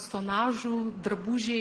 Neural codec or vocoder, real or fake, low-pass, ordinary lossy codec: none; real; 9.9 kHz; Opus, 16 kbps